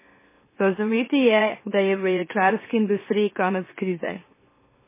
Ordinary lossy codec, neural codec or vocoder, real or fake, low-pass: MP3, 16 kbps; autoencoder, 44.1 kHz, a latent of 192 numbers a frame, MeloTTS; fake; 3.6 kHz